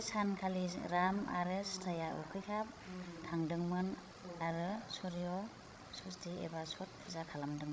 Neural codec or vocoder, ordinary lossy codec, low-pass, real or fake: codec, 16 kHz, 16 kbps, FreqCodec, larger model; none; none; fake